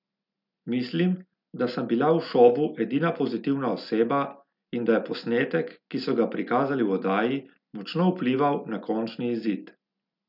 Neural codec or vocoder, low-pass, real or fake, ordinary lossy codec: none; 5.4 kHz; real; none